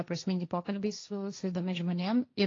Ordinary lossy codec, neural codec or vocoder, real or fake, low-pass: AAC, 32 kbps; codec, 16 kHz, 1.1 kbps, Voila-Tokenizer; fake; 7.2 kHz